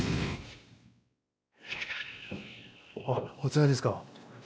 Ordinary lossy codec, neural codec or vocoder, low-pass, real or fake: none; codec, 16 kHz, 1 kbps, X-Codec, WavLM features, trained on Multilingual LibriSpeech; none; fake